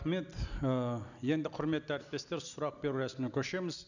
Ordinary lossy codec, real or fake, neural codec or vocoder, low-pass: none; real; none; 7.2 kHz